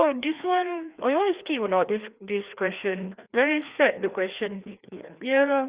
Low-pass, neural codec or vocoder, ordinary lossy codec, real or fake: 3.6 kHz; codec, 16 kHz, 2 kbps, FreqCodec, larger model; Opus, 64 kbps; fake